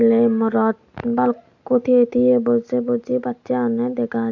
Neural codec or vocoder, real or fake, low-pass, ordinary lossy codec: none; real; 7.2 kHz; AAC, 48 kbps